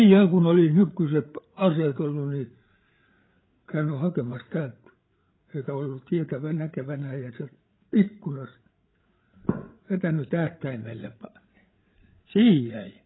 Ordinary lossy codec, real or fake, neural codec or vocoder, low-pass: AAC, 16 kbps; fake; codec, 16 kHz, 8 kbps, FreqCodec, larger model; 7.2 kHz